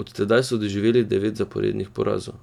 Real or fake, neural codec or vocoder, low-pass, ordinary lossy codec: real; none; 19.8 kHz; none